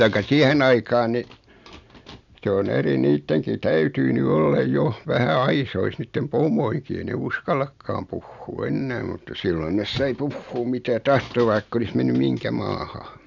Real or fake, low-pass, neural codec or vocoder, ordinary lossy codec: real; 7.2 kHz; none; none